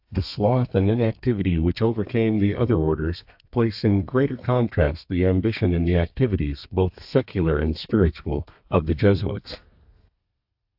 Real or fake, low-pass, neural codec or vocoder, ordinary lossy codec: fake; 5.4 kHz; codec, 32 kHz, 1.9 kbps, SNAC; AAC, 48 kbps